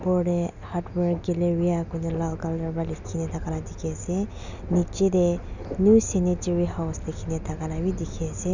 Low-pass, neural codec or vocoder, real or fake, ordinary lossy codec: 7.2 kHz; none; real; none